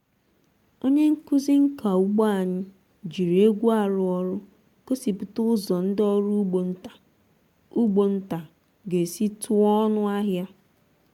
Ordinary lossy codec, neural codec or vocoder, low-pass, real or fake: MP3, 96 kbps; none; 19.8 kHz; real